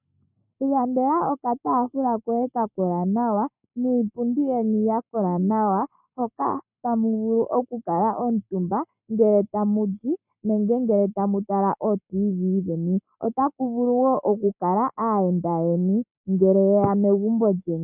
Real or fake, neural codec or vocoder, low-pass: fake; codec, 44.1 kHz, 7.8 kbps, Pupu-Codec; 3.6 kHz